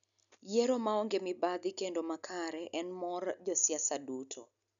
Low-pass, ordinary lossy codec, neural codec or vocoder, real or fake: 7.2 kHz; none; none; real